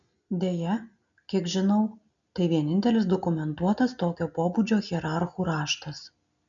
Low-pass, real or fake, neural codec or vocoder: 7.2 kHz; real; none